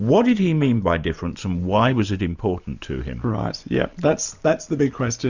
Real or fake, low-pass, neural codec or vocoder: real; 7.2 kHz; none